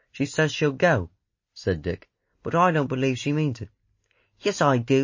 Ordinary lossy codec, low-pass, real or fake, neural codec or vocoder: MP3, 32 kbps; 7.2 kHz; real; none